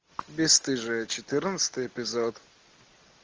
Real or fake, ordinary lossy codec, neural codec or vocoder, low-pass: real; Opus, 24 kbps; none; 7.2 kHz